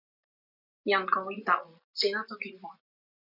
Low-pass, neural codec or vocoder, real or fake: 5.4 kHz; none; real